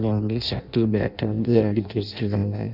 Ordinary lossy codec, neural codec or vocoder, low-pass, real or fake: none; codec, 16 kHz in and 24 kHz out, 0.6 kbps, FireRedTTS-2 codec; 5.4 kHz; fake